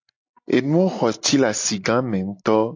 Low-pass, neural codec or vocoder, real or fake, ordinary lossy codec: 7.2 kHz; none; real; AAC, 48 kbps